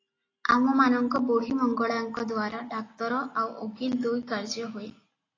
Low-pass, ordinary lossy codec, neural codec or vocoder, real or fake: 7.2 kHz; AAC, 32 kbps; none; real